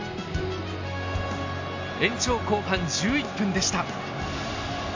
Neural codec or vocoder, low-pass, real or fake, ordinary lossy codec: none; 7.2 kHz; real; AAC, 48 kbps